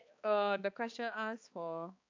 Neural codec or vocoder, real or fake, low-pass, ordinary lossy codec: codec, 16 kHz, 2 kbps, X-Codec, HuBERT features, trained on balanced general audio; fake; 7.2 kHz; AAC, 48 kbps